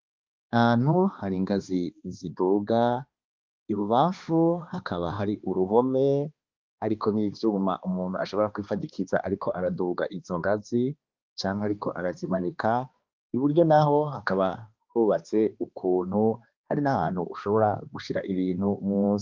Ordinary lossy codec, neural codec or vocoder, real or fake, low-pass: Opus, 32 kbps; codec, 16 kHz, 2 kbps, X-Codec, HuBERT features, trained on balanced general audio; fake; 7.2 kHz